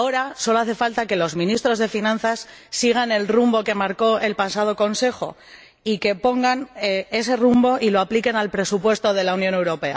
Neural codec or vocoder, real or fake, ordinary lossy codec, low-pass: none; real; none; none